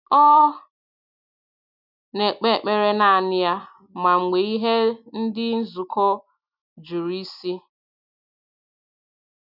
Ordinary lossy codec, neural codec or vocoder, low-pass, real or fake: none; none; 5.4 kHz; real